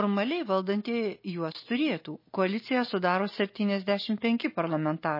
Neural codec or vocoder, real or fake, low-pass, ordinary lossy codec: none; real; 5.4 kHz; MP3, 24 kbps